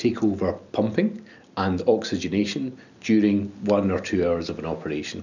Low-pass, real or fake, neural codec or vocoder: 7.2 kHz; real; none